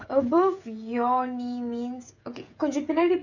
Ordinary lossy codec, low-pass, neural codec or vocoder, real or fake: none; 7.2 kHz; codec, 16 kHz, 16 kbps, FreqCodec, smaller model; fake